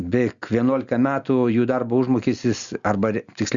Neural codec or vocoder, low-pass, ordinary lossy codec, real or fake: none; 7.2 kHz; Opus, 64 kbps; real